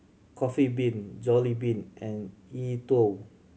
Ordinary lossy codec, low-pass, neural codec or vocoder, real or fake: none; none; none; real